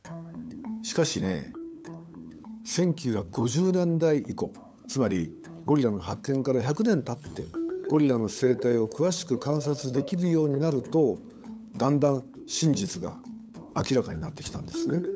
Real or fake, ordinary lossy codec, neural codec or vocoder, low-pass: fake; none; codec, 16 kHz, 8 kbps, FunCodec, trained on LibriTTS, 25 frames a second; none